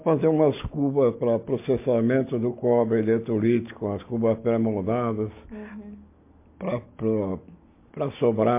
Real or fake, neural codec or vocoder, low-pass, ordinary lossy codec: real; none; 3.6 kHz; MP3, 24 kbps